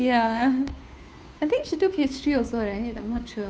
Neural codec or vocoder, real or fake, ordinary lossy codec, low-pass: codec, 16 kHz, 2 kbps, FunCodec, trained on Chinese and English, 25 frames a second; fake; none; none